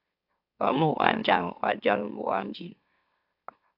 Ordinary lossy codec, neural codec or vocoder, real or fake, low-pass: AAC, 48 kbps; autoencoder, 44.1 kHz, a latent of 192 numbers a frame, MeloTTS; fake; 5.4 kHz